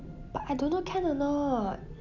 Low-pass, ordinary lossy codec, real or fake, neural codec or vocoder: 7.2 kHz; none; real; none